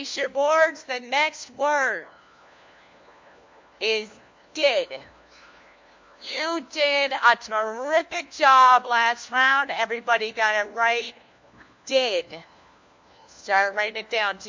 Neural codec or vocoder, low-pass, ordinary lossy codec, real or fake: codec, 16 kHz, 1 kbps, FunCodec, trained on LibriTTS, 50 frames a second; 7.2 kHz; MP3, 48 kbps; fake